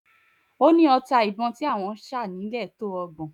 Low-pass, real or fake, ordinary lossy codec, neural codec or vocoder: 19.8 kHz; fake; none; autoencoder, 48 kHz, 128 numbers a frame, DAC-VAE, trained on Japanese speech